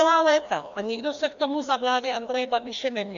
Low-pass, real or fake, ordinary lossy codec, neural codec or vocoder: 7.2 kHz; fake; AAC, 64 kbps; codec, 16 kHz, 1 kbps, FreqCodec, larger model